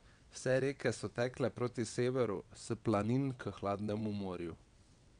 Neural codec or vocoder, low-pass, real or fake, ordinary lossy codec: vocoder, 22.05 kHz, 80 mel bands, WaveNeXt; 9.9 kHz; fake; none